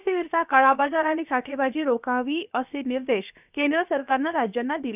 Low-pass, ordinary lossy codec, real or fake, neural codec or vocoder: 3.6 kHz; none; fake; codec, 16 kHz, about 1 kbps, DyCAST, with the encoder's durations